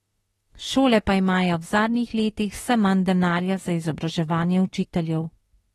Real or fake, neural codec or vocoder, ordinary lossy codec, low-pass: fake; autoencoder, 48 kHz, 32 numbers a frame, DAC-VAE, trained on Japanese speech; AAC, 32 kbps; 19.8 kHz